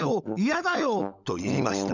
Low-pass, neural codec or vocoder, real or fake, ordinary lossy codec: 7.2 kHz; codec, 16 kHz, 16 kbps, FunCodec, trained on LibriTTS, 50 frames a second; fake; none